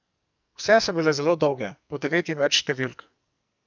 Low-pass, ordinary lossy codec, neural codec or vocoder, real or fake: 7.2 kHz; none; codec, 44.1 kHz, 2.6 kbps, SNAC; fake